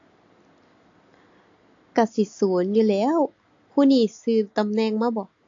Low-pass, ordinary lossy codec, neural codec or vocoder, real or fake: 7.2 kHz; AAC, 48 kbps; none; real